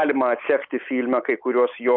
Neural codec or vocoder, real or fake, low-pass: none; real; 5.4 kHz